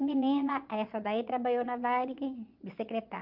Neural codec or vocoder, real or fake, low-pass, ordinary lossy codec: vocoder, 22.05 kHz, 80 mel bands, Vocos; fake; 5.4 kHz; Opus, 24 kbps